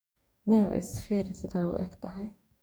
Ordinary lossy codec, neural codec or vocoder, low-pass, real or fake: none; codec, 44.1 kHz, 2.6 kbps, DAC; none; fake